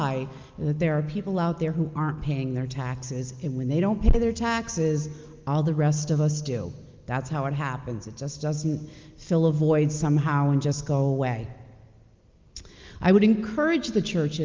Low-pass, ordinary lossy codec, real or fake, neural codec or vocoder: 7.2 kHz; Opus, 24 kbps; real; none